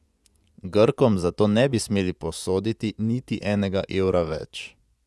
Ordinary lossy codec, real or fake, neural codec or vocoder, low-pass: none; fake; vocoder, 24 kHz, 100 mel bands, Vocos; none